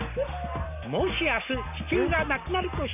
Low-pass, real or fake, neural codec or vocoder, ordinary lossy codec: 3.6 kHz; real; none; none